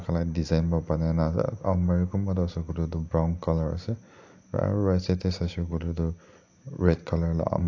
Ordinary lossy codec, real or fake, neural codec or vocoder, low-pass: AAC, 48 kbps; real; none; 7.2 kHz